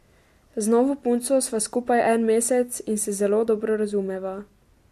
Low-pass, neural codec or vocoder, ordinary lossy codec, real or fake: 14.4 kHz; none; MP3, 64 kbps; real